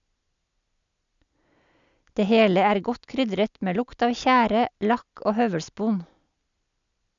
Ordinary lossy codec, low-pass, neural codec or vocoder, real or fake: none; 7.2 kHz; none; real